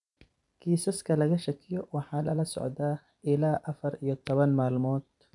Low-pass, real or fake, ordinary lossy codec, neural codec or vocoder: 10.8 kHz; fake; none; vocoder, 44.1 kHz, 128 mel bands every 256 samples, BigVGAN v2